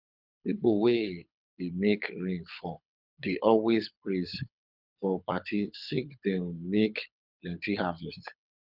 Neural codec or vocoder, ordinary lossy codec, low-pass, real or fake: codec, 24 kHz, 6 kbps, HILCodec; none; 5.4 kHz; fake